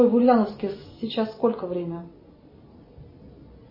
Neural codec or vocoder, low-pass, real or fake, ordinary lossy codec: none; 5.4 kHz; real; MP3, 24 kbps